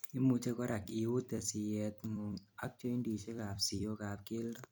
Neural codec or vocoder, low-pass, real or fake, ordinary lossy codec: vocoder, 44.1 kHz, 128 mel bands every 256 samples, BigVGAN v2; none; fake; none